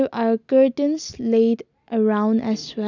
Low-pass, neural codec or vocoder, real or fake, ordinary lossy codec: 7.2 kHz; none; real; none